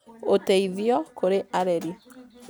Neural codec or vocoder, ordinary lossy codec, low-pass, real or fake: none; none; none; real